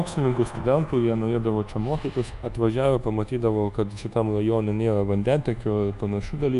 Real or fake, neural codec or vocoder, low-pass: fake; codec, 24 kHz, 1.2 kbps, DualCodec; 10.8 kHz